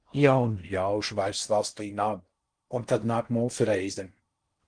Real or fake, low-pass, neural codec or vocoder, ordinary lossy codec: fake; 9.9 kHz; codec, 16 kHz in and 24 kHz out, 0.6 kbps, FocalCodec, streaming, 4096 codes; Opus, 24 kbps